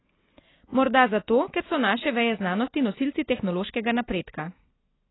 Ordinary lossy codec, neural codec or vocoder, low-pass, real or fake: AAC, 16 kbps; none; 7.2 kHz; real